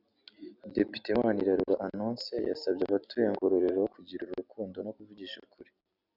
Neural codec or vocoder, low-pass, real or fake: none; 5.4 kHz; real